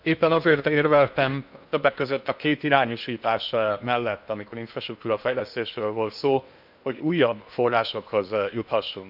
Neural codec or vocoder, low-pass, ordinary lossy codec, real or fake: codec, 16 kHz in and 24 kHz out, 0.8 kbps, FocalCodec, streaming, 65536 codes; 5.4 kHz; none; fake